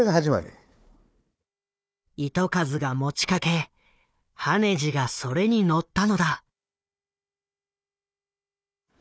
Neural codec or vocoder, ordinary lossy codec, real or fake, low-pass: codec, 16 kHz, 4 kbps, FunCodec, trained on Chinese and English, 50 frames a second; none; fake; none